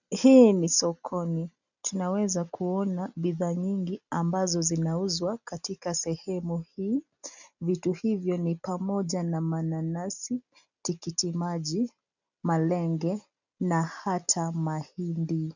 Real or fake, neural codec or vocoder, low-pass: real; none; 7.2 kHz